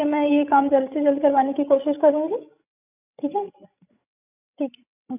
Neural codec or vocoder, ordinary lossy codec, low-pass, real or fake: codec, 16 kHz, 16 kbps, FreqCodec, larger model; none; 3.6 kHz; fake